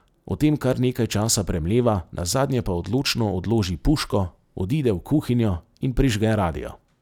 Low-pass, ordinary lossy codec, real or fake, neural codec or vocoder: 19.8 kHz; none; real; none